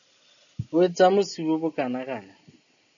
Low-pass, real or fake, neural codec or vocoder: 7.2 kHz; real; none